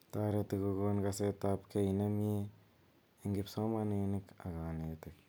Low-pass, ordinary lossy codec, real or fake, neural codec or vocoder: none; none; real; none